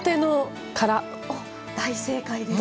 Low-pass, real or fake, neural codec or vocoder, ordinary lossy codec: none; real; none; none